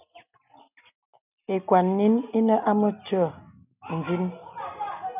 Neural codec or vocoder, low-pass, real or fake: none; 3.6 kHz; real